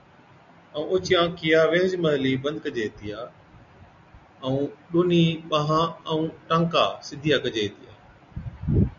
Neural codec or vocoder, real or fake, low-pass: none; real; 7.2 kHz